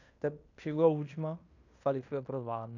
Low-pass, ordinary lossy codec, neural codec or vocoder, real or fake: 7.2 kHz; none; codec, 16 kHz in and 24 kHz out, 0.9 kbps, LongCat-Audio-Codec, fine tuned four codebook decoder; fake